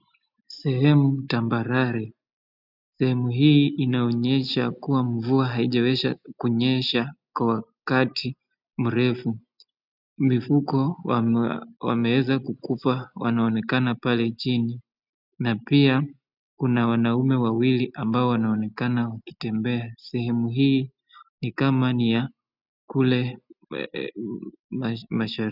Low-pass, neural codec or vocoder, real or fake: 5.4 kHz; none; real